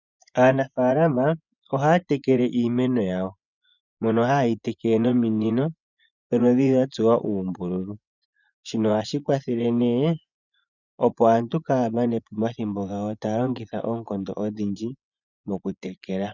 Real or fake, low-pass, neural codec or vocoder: fake; 7.2 kHz; vocoder, 24 kHz, 100 mel bands, Vocos